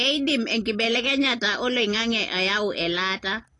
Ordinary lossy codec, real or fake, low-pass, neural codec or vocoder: AAC, 32 kbps; real; 10.8 kHz; none